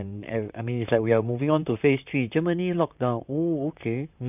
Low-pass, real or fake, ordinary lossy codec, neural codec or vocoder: 3.6 kHz; fake; none; vocoder, 44.1 kHz, 128 mel bands, Pupu-Vocoder